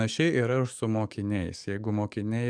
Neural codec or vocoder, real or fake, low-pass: codec, 44.1 kHz, 7.8 kbps, DAC; fake; 9.9 kHz